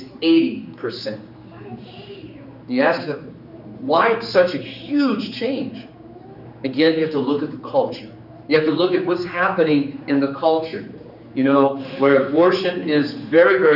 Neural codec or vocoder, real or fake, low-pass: codec, 16 kHz, 4 kbps, X-Codec, HuBERT features, trained on general audio; fake; 5.4 kHz